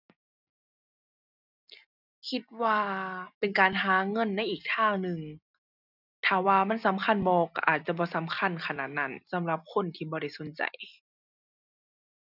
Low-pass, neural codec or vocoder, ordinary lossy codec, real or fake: 5.4 kHz; none; none; real